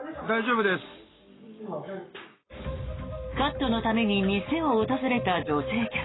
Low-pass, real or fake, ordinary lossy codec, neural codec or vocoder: 7.2 kHz; fake; AAC, 16 kbps; codec, 44.1 kHz, 7.8 kbps, Pupu-Codec